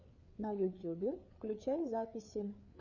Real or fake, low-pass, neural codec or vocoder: fake; 7.2 kHz; codec, 16 kHz, 4 kbps, FreqCodec, larger model